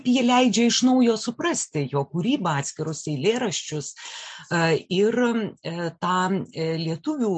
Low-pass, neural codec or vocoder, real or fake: 9.9 kHz; none; real